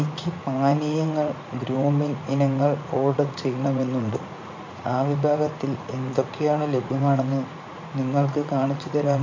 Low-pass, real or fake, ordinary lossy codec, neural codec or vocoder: 7.2 kHz; fake; AAC, 48 kbps; vocoder, 44.1 kHz, 80 mel bands, Vocos